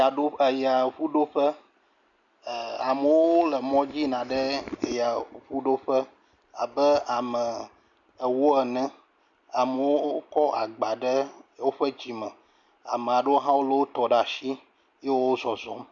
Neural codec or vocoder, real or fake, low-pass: none; real; 7.2 kHz